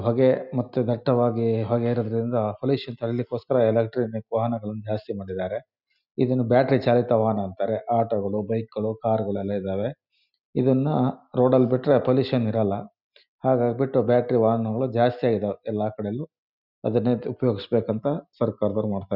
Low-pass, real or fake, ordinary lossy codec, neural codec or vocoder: 5.4 kHz; real; none; none